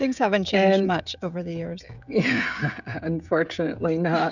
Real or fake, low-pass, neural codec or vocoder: fake; 7.2 kHz; codec, 16 kHz, 16 kbps, FreqCodec, smaller model